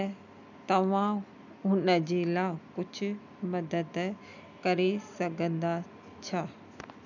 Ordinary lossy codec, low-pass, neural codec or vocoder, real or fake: none; 7.2 kHz; none; real